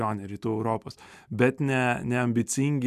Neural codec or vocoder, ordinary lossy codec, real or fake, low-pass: none; MP3, 96 kbps; real; 14.4 kHz